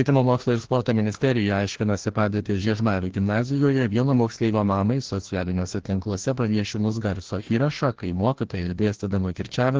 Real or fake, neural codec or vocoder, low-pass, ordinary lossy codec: fake; codec, 16 kHz, 1 kbps, FreqCodec, larger model; 7.2 kHz; Opus, 16 kbps